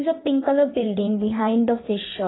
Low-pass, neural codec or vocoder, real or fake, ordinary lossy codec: 7.2 kHz; codec, 16 kHz in and 24 kHz out, 1.1 kbps, FireRedTTS-2 codec; fake; AAC, 16 kbps